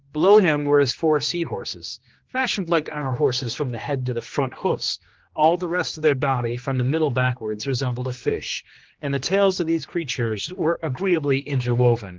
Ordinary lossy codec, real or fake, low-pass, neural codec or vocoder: Opus, 16 kbps; fake; 7.2 kHz; codec, 16 kHz, 2 kbps, X-Codec, HuBERT features, trained on general audio